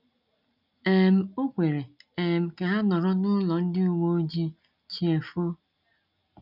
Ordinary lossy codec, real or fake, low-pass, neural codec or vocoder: none; real; 5.4 kHz; none